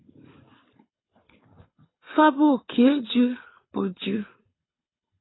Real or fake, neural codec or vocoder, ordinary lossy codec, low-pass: fake; codec, 24 kHz, 6 kbps, HILCodec; AAC, 16 kbps; 7.2 kHz